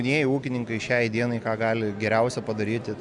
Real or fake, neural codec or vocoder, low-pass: real; none; 10.8 kHz